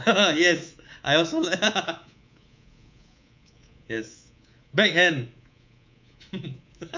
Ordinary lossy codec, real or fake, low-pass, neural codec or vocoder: MP3, 64 kbps; fake; 7.2 kHz; codec, 24 kHz, 3.1 kbps, DualCodec